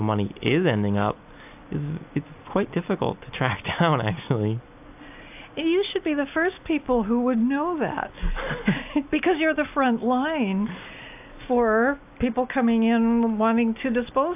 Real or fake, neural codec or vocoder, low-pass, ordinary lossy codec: real; none; 3.6 kHz; AAC, 32 kbps